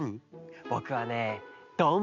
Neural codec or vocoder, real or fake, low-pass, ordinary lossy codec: none; real; 7.2 kHz; none